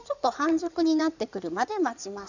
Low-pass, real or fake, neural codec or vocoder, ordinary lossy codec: 7.2 kHz; fake; codec, 16 kHz in and 24 kHz out, 2.2 kbps, FireRedTTS-2 codec; none